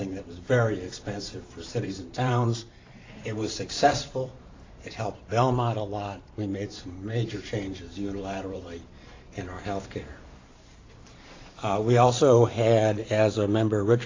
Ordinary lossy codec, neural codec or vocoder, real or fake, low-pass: AAC, 32 kbps; vocoder, 44.1 kHz, 128 mel bands every 512 samples, BigVGAN v2; fake; 7.2 kHz